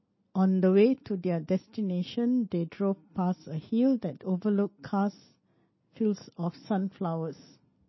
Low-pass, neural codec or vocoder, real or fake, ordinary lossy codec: 7.2 kHz; none; real; MP3, 24 kbps